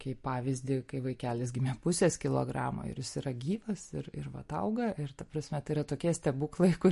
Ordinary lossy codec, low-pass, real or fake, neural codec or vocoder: MP3, 48 kbps; 14.4 kHz; real; none